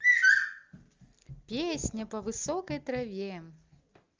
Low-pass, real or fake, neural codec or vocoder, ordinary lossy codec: 7.2 kHz; real; none; Opus, 32 kbps